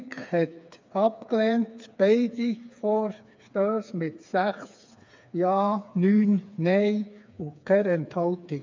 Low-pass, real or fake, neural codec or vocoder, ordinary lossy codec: 7.2 kHz; fake; codec, 16 kHz, 4 kbps, FreqCodec, smaller model; MP3, 64 kbps